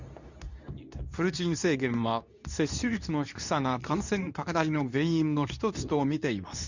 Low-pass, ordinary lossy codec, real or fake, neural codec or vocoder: 7.2 kHz; none; fake; codec, 24 kHz, 0.9 kbps, WavTokenizer, medium speech release version 2